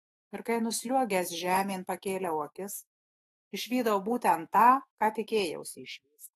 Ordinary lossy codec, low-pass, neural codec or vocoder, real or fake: AAC, 32 kbps; 19.8 kHz; autoencoder, 48 kHz, 128 numbers a frame, DAC-VAE, trained on Japanese speech; fake